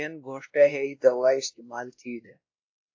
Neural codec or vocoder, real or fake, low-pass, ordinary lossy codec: codec, 16 kHz, 1 kbps, X-Codec, WavLM features, trained on Multilingual LibriSpeech; fake; 7.2 kHz; AAC, 48 kbps